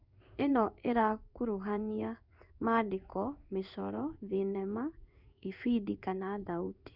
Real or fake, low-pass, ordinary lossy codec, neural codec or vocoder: fake; 5.4 kHz; none; codec, 16 kHz in and 24 kHz out, 1 kbps, XY-Tokenizer